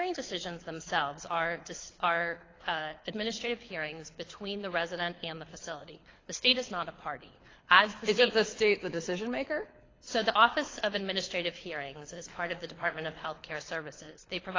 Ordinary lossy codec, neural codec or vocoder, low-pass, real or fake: AAC, 32 kbps; codec, 24 kHz, 6 kbps, HILCodec; 7.2 kHz; fake